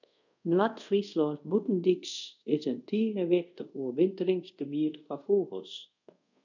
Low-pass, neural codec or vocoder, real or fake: 7.2 kHz; codec, 24 kHz, 0.5 kbps, DualCodec; fake